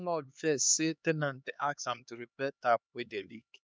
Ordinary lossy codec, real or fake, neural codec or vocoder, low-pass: none; fake; codec, 16 kHz, 2 kbps, X-Codec, HuBERT features, trained on LibriSpeech; none